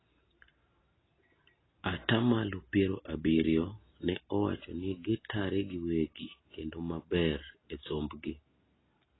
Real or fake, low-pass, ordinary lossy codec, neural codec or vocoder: real; 7.2 kHz; AAC, 16 kbps; none